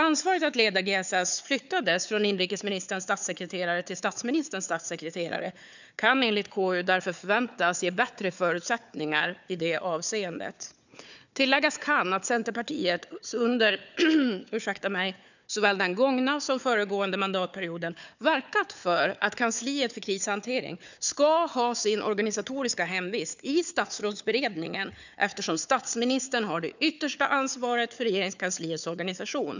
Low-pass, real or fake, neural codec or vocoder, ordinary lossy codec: 7.2 kHz; fake; codec, 16 kHz, 4 kbps, FunCodec, trained on Chinese and English, 50 frames a second; none